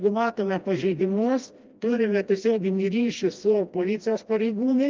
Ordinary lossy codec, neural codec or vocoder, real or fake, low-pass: Opus, 24 kbps; codec, 16 kHz, 1 kbps, FreqCodec, smaller model; fake; 7.2 kHz